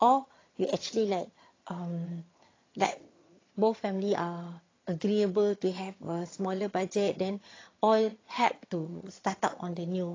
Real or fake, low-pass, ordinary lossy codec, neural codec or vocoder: fake; 7.2 kHz; AAC, 32 kbps; vocoder, 22.05 kHz, 80 mel bands, HiFi-GAN